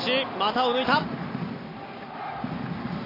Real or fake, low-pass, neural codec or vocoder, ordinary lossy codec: real; 5.4 kHz; none; AAC, 24 kbps